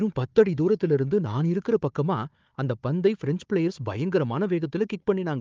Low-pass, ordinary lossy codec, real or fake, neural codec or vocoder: 7.2 kHz; Opus, 32 kbps; fake; codec, 16 kHz, 4 kbps, FunCodec, trained on Chinese and English, 50 frames a second